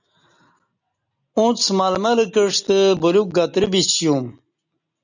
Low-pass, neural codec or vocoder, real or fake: 7.2 kHz; none; real